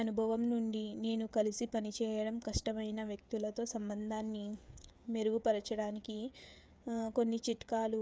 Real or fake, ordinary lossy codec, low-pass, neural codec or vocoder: fake; none; none; codec, 16 kHz, 16 kbps, FreqCodec, smaller model